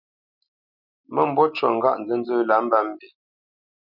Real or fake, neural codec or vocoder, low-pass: real; none; 5.4 kHz